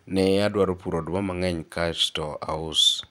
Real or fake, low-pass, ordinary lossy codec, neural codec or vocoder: fake; 19.8 kHz; none; vocoder, 44.1 kHz, 128 mel bands every 512 samples, BigVGAN v2